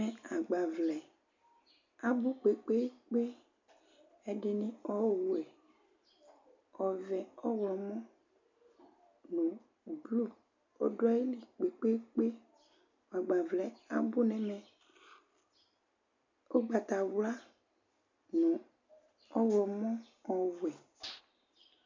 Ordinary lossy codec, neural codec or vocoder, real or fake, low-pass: MP3, 64 kbps; none; real; 7.2 kHz